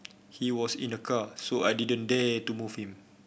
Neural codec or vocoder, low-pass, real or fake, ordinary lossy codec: none; none; real; none